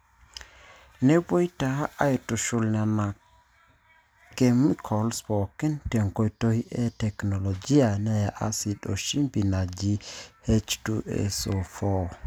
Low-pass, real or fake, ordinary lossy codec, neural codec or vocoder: none; real; none; none